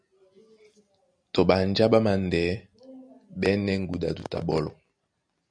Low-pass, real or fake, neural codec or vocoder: 9.9 kHz; real; none